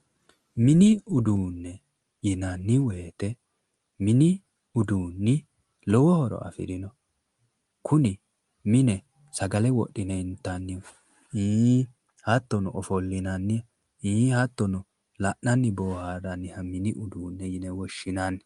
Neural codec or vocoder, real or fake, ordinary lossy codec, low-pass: none; real; Opus, 32 kbps; 10.8 kHz